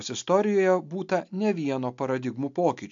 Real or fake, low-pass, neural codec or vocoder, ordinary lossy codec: real; 7.2 kHz; none; MP3, 64 kbps